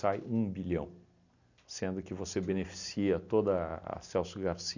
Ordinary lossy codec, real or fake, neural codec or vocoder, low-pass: none; real; none; 7.2 kHz